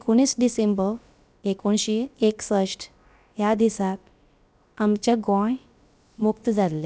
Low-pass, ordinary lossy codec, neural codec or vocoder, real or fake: none; none; codec, 16 kHz, about 1 kbps, DyCAST, with the encoder's durations; fake